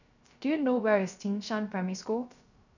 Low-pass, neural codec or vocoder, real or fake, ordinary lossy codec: 7.2 kHz; codec, 16 kHz, 0.3 kbps, FocalCodec; fake; none